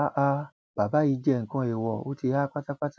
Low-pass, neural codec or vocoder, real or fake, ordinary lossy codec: none; none; real; none